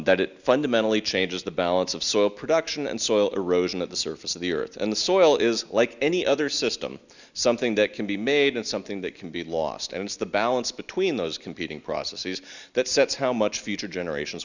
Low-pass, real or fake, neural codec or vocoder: 7.2 kHz; real; none